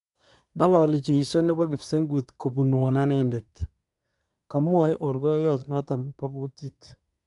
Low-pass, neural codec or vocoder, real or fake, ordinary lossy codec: 10.8 kHz; codec, 24 kHz, 1 kbps, SNAC; fake; none